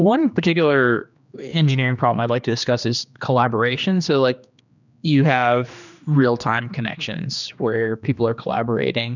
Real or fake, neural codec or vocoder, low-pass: fake; codec, 16 kHz, 2 kbps, X-Codec, HuBERT features, trained on general audio; 7.2 kHz